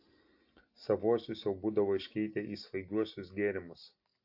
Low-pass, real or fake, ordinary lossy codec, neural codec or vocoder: 5.4 kHz; real; AAC, 48 kbps; none